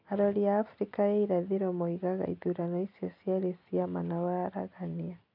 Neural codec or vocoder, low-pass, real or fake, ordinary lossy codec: none; 5.4 kHz; real; none